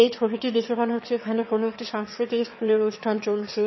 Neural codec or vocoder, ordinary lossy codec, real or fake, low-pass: autoencoder, 22.05 kHz, a latent of 192 numbers a frame, VITS, trained on one speaker; MP3, 24 kbps; fake; 7.2 kHz